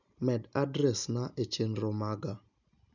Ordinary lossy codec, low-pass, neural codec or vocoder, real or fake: none; 7.2 kHz; none; real